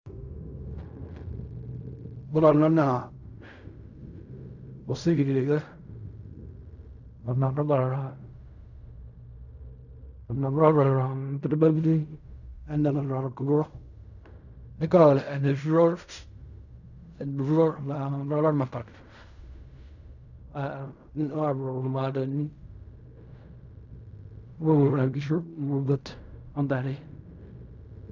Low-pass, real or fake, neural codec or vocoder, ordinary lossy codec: 7.2 kHz; fake; codec, 16 kHz in and 24 kHz out, 0.4 kbps, LongCat-Audio-Codec, fine tuned four codebook decoder; none